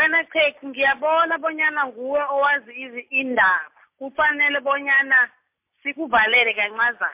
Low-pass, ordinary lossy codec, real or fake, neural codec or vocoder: 3.6 kHz; MP3, 32 kbps; real; none